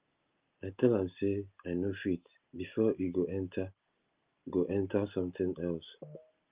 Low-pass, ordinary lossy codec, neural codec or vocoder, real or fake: 3.6 kHz; Opus, 32 kbps; none; real